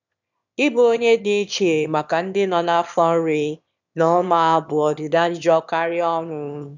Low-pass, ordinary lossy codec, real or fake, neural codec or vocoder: 7.2 kHz; none; fake; autoencoder, 22.05 kHz, a latent of 192 numbers a frame, VITS, trained on one speaker